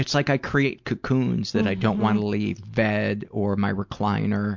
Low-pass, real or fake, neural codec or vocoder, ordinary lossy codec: 7.2 kHz; real; none; MP3, 64 kbps